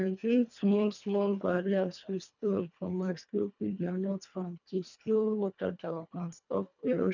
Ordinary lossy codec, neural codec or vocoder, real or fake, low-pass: none; codec, 24 kHz, 1.5 kbps, HILCodec; fake; 7.2 kHz